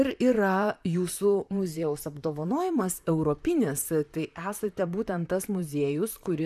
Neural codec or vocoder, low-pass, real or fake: vocoder, 44.1 kHz, 128 mel bands, Pupu-Vocoder; 14.4 kHz; fake